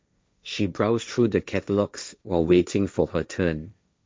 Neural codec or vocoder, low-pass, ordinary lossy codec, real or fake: codec, 16 kHz, 1.1 kbps, Voila-Tokenizer; none; none; fake